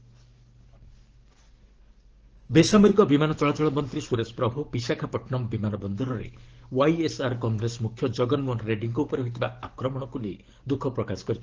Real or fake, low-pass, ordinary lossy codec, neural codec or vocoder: fake; 7.2 kHz; Opus, 16 kbps; codec, 44.1 kHz, 7.8 kbps, DAC